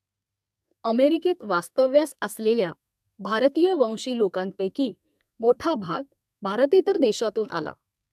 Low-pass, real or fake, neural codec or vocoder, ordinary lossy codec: 14.4 kHz; fake; codec, 32 kHz, 1.9 kbps, SNAC; AAC, 96 kbps